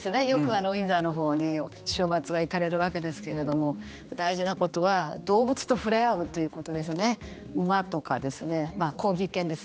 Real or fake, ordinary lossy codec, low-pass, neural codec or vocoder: fake; none; none; codec, 16 kHz, 2 kbps, X-Codec, HuBERT features, trained on general audio